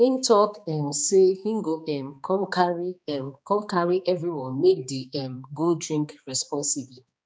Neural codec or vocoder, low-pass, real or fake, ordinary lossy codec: codec, 16 kHz, 2 kbps, X-Codec, HuBERT features, trained on balanced general audio; none; fake; none